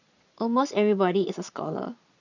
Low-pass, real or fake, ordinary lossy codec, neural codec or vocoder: 7.2 kHz; fake; none; vocoder, 22.05 kHz, 80 mel bands, Vocos